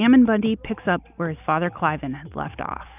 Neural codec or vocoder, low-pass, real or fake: none; 3.6 kHz; real